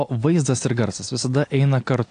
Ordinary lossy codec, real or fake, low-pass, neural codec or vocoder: AAC, 48 kbps; real; 9.9 kHz; none